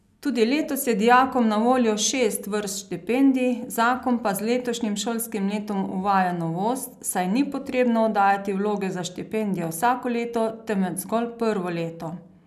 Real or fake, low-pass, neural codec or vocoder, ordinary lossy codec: real; 14.4 kHz; none; none